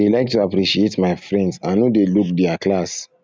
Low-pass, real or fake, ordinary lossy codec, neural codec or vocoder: none; real; none; none